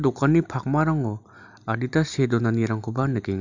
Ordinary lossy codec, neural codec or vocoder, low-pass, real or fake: none; none; 7.2 kHz; real